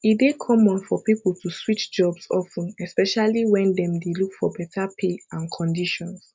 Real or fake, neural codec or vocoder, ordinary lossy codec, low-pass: real; none; none; none